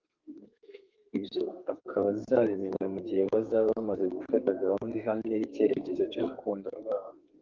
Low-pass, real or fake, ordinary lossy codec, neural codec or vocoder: 7.2 kHz; fake; Opus, 32 kbps; codec, 32 kHz, 1.9 kbps, SNAC